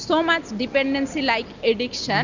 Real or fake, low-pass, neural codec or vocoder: real; 7.2 kHz; none